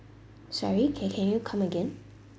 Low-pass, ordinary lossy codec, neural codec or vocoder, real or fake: none; none; none; real